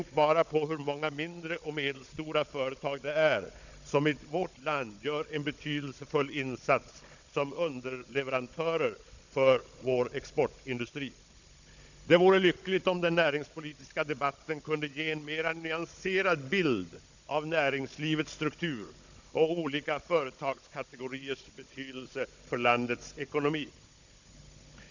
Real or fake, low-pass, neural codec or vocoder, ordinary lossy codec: fake; 7.2 kHz; codec, 16 kHz, 16 kbps, FunCodec, trained on Chinese and English, 50 frames a second; none